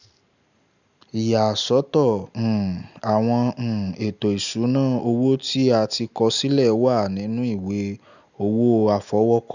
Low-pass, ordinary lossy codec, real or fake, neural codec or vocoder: 7.2 kHz; none; real; none